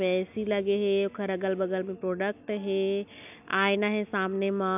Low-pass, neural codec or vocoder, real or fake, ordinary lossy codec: 3.6 kHz; none; real; none